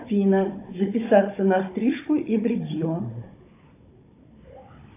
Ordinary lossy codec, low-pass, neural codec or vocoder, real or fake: AAC, 24 kbps; 3.6 kHz; codec, 16 kHz, 16 kbps, FunCodec, trained on Chinese and English, 50 frames a second; fake